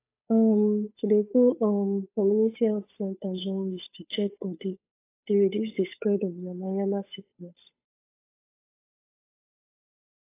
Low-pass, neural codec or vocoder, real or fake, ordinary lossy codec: 3.6 kHz; codec, 16 kHz, 8 kbps, FunCodec, trained on Chinese and English, 25 frames a second; fake; AAC, 24 kbps